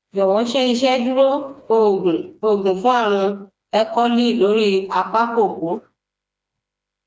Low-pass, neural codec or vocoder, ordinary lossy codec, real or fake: none; codec, 16 kHz, 2 kbps, FreqCodec, smaller model; none; fake